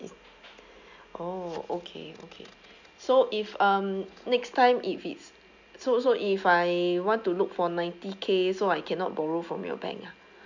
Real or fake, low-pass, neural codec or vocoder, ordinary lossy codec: real; 7.2 kHz; none; none